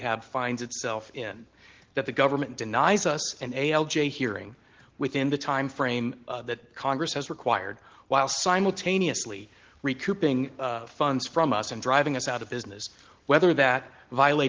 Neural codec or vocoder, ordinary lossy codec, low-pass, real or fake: none; Opus, 16 kbps; 7.2 kHz; real